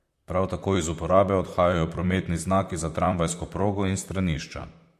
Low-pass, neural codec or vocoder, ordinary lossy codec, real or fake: 14.4 kHz; vocoder, 44.1 kHz, 128 mel bands, Pupu-Vocoder; MP3, 64 kbps; fake